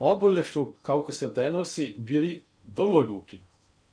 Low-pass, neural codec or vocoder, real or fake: 9.9 kHz; codec, 16 kHz in and 24 kHz out, 0.6 kbps, FocalCodec, streaming, 2048 codes; fake